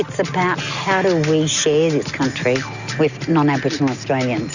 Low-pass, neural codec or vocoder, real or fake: 7.2 kHz; none; real